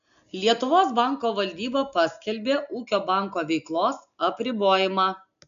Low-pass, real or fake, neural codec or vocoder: 7.2 kHz; real; none